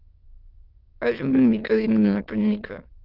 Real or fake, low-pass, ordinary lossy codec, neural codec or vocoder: fake; 5.4 kHz; Opus, 32 kbps; autoencoder, 22.05 kHz, a latent of 192 numbers a frame, VITS, trained on many speakers